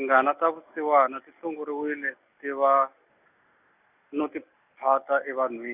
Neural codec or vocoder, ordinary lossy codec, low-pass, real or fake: none; none; 3.6 kHz; real